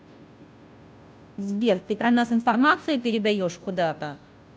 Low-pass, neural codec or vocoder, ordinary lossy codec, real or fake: none; codec, 16 kHz, 0.5 kbps, FunCodec, trained on Chinese and English, 25 frames a second; none; fake